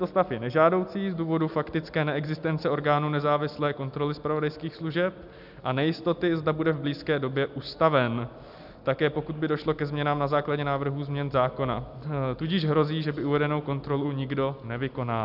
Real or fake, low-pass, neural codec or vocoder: real; 5.4 kHz; none